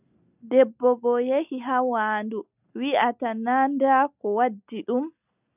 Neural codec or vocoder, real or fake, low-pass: none; real; 3.6 kHz